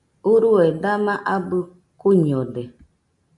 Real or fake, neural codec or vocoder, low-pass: real; none; 10.8 kHz